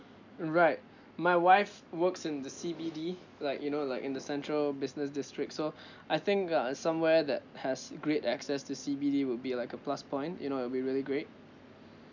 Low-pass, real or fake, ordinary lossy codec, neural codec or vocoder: 7.2 kHz; real; none; none